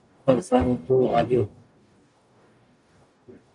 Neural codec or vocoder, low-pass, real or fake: codec, 44.1 kHz, 0.9 kbps, DAC; 10.8 kHz; fake